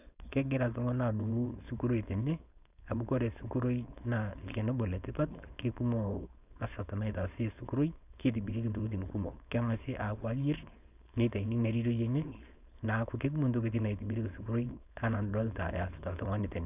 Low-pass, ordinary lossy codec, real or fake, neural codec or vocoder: 3.6 kHz; none; fake; codec, 16 kHz, 4.8 kbps, FACodec